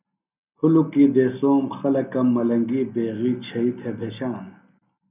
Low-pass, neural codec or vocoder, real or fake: 3.6 kHz; none; real